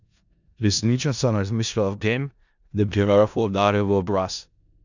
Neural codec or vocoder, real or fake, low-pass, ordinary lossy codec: codec, 16 kHz in and 24 kHz out, 0.4 kbps, LongCat-Audio-Codec, four codebook decoder; fake; 7.2 kHz; none